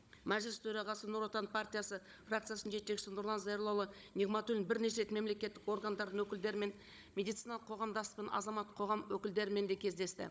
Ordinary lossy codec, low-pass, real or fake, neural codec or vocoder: none; none; fake; codec, 16 kHz, 16 kbps, FunCodec, trained on Chinese and English, 50 frames a second